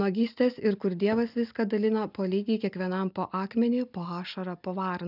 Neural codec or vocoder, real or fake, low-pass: none; real; 5.4 kHz